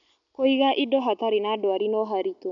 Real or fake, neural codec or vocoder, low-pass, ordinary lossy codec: real; none; 7.2 kHz; none